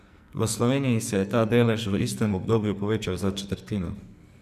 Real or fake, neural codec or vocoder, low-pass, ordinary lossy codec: fake; codec, 44.1 kHz, 2.6 kbps, SNAC; 14.4 kHz; none